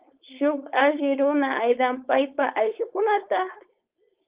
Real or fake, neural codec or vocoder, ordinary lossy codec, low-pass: fake; codec, 16 kHz, 4.8 kbps, FACodec; Opus, 32 kbps; 3.6 kHz